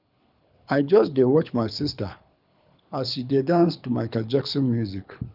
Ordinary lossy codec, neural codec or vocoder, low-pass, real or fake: none; codec, 24 kHz, 6 kbps, HILCodec; 5.4 kHz; fake